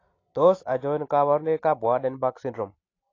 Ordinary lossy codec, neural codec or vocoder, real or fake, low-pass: AAC, 32 kbps; none; real; 7.2 kHz